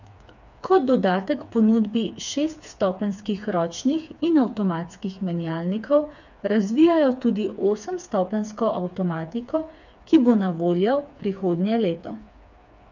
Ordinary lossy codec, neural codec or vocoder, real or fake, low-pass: none; codec, 16 kHz, 4 kbps, FreqCodec, smaller model; fake; 7.2 kHz